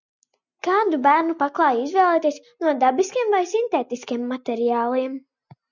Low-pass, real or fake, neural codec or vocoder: 7.2 kHz; real; none